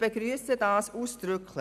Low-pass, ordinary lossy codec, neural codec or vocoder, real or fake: 14.4 kHz; none; none; real